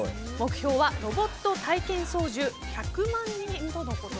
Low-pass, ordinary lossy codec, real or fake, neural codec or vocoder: none; none; real; none